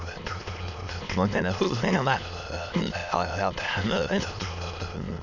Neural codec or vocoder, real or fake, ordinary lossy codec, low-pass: autoencoder, 22.05 kHz, a latent of 192 numbers a frame, VITS, trained on many speakers; fake; none; 7.2 kHz